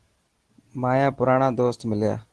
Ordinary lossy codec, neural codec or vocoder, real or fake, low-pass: Opus, 16 kbps; none; real; 10.8 kHz